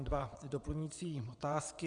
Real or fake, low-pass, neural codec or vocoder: fake; 9.9 kHz; vocoder, 22.05 kHz, 80 mel bands, WaveNeXt